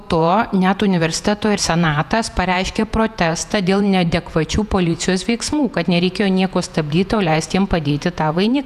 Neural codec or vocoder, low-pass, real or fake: vocoder, 48 kHz, 128 mel bands, Vocos; 14.4 kHz; fake